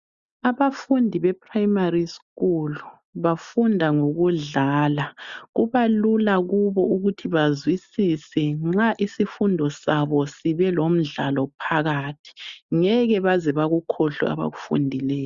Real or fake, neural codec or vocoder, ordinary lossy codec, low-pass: real; none; Opus, 64 kbps; 7.2 kHz